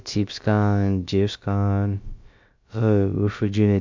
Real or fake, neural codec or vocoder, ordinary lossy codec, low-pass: fake; codec, 16 kHz, about 1 kbps, DyCAST, with the encoder's durations; MP3, 64 kbps; 7.2 kHz